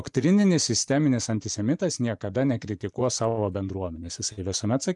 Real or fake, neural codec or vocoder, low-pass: fake; vocoder, 24 kHz, 100 mel bands, Vocos; 10.8 kHz